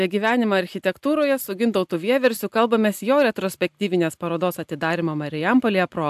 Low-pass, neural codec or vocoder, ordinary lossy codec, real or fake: 14.4 kHz; none; AAC, 96 kbps; real